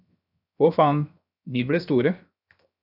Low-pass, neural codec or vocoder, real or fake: 5.4 kHz; codec, 16 kHz, 0.7 kbps, FocalCodec; fake